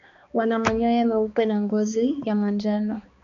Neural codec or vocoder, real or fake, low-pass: codec, 16 kHz, 2 kbps, X-Codec, HuBERT features, trained on balanced general audio; fake; 7.2 kHz